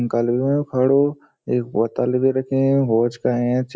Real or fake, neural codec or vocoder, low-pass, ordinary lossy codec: real; none; none; none